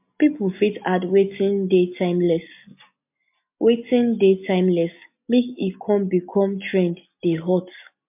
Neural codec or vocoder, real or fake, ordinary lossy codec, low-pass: none; real; MP3, 32 kbps; 3.6 kHz